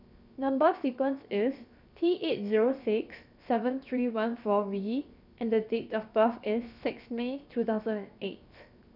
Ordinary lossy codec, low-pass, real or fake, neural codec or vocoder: none; 5.4 kHz; fake; codec, 16 kHz, 0.7 kbps, FocalCodec